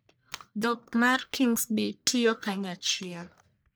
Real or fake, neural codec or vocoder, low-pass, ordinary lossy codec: fake; codec, 44.1 kHz, 1.7 kbps, Pupu-Codec; none; none